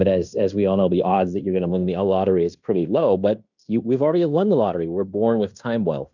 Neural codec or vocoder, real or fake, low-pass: codec, 16 kHz in and 24 kHz out, 0.9 kbps, LongCat-Audio-Codec, fine tuned four codebook decoder; fake; 7.2 kHz